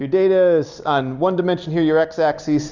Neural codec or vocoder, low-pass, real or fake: none; 7.2 kHz; real